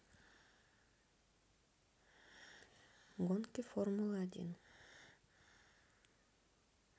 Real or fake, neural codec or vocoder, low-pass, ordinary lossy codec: real; none; none; none